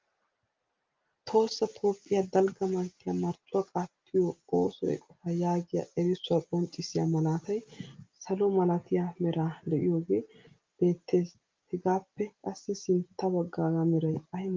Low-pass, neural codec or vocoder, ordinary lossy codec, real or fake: 7.2 kHz; none; Opus, 32 kbps; real